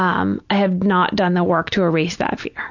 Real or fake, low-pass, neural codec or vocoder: real; 7.2 kHz; none